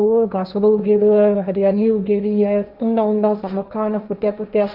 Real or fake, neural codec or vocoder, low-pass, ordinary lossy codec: fake; codec, 16 kHz, 1.1 kbps, Voila-Tokenizer; 5.4 kHz; none